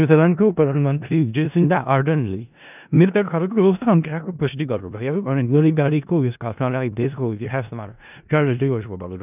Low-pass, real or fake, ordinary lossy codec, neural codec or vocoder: 3.6 kHz; fake; none; codec, 16 kHz in and 24 kHz out, 0.4 kbps, LongCat-Audio-Codec, four codebook decoder